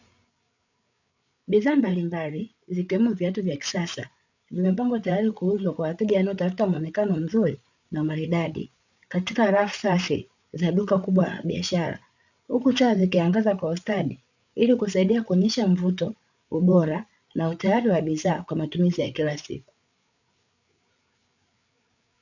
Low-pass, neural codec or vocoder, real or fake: 7.2 kHz; codec, 16 kHz, 8 kbps, FreqCodec, larger model; fake